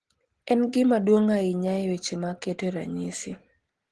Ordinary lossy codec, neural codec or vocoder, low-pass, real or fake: Opus, 16 kbps; none; 10.8 kHz; real